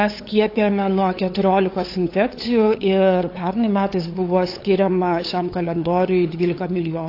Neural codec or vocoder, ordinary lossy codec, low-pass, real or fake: codec, 16 kHz, 8 kbps, FunCodec, trained on LibriTTS, 25 frames a second; AAC, 32 kbps; 5.4 kHz; fake